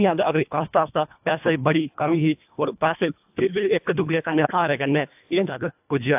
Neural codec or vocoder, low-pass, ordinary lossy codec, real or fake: codec, 24 kHz, 1.5 kbps, HILCodec; 3.6 kHz; AAC, 32 kbps; fake